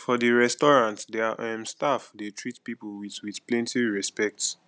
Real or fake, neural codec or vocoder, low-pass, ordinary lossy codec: real; none; none; none